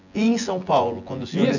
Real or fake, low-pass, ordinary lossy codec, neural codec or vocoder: fake; 7.2 kHz; none; vocoder, 24 kHz, 100 mel bands, Vocos